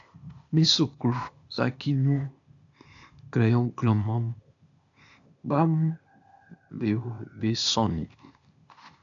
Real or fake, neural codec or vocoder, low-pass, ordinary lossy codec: fake; codec, 16 kHz, 0.8 kbps, ZipCodec; 7.2 kHz; MP3, 96 kbps